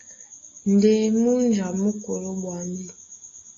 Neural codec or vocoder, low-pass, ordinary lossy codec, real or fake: none; 7.2 kHz; AAC, 32 kbps; real